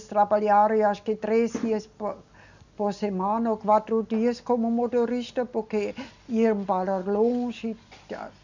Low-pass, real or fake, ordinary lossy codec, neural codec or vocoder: 7.2 kHz; real; none; none